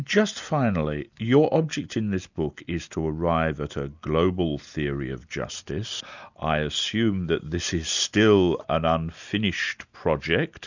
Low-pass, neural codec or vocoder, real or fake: 7.2 kHz; none; real